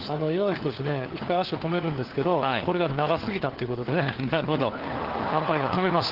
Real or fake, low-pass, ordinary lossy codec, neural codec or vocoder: fake; 5.4 kHz; Opus, 16 kbps; codec, 16 kHz, 4 kbps, FunCodec, trained on LibriTTS, 50 frames a second